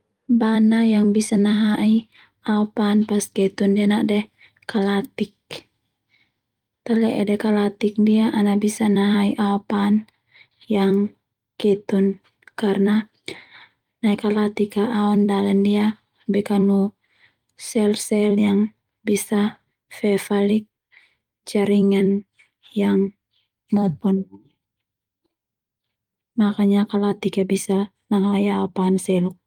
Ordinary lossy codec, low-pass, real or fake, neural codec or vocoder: Opus, 32 kbps; 19.8 kHz; fake; vocoder, 44.1 kHz, 128 mel bands every 256 samples, BigVGAN v2